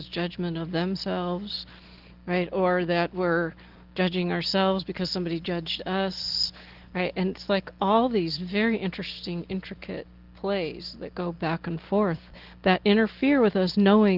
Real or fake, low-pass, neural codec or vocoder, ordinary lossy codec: real; 5.4 kHz; none; Opus, 16 kbps